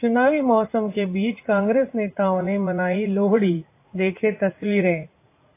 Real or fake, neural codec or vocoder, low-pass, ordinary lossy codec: fake; vocoder, 44.1 kHz, 80 mel bands, Vocos; 3.6 kHz; AAC, 24 kbps